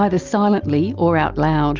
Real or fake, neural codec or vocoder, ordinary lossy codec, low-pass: real; none; Opus, 24 kbps; 7.2 kHz